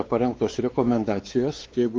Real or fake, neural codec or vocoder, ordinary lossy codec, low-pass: fake; codec, 16 kHz, 4 kbps, X-Codec, WavLM features, trained on Multilingual LibriSpeech; Opus, 24 kbps; 7.2 kHz